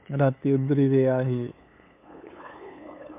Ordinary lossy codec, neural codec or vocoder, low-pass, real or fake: MP3, 32 kbps; codec, 16 kHz, 8 kbps, FunCodec, trained on LibriTTS, 25 frames a second; 3.6 kHz; fake